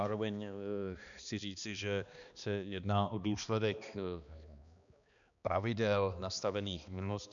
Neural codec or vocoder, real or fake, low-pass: codec, 16 kHz, 2 kbps, X-Codec, HuBERT features, trained on balanced general audio; fake; 7.2 kHz